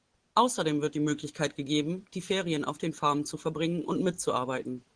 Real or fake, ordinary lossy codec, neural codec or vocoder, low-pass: real; Opus, 16 kbps; none; 9.9 kHz